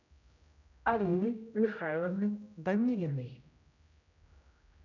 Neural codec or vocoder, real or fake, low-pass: codec, 16 kHz, 0.5 kbps, X-Codec, HuBERT features, trained on general audio; fake; 7.2 kHz